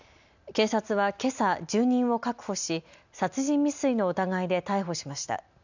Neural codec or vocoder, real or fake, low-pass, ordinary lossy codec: none; real; 7.2 kHz; none